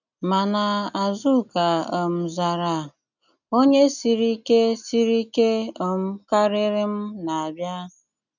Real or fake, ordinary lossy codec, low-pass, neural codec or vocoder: real; none; 7.2 kHz; none